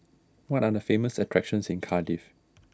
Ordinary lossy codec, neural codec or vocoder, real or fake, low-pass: none; none; real; none